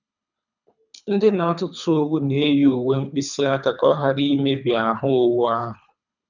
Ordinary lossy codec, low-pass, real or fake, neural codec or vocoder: none; 7.2 kHz; fake; codec, 24 kHz, 3 kbps, HILCodec